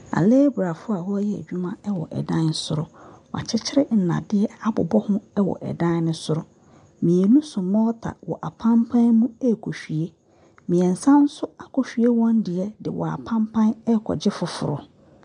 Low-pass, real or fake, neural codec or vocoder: 9.9 kHz; real; none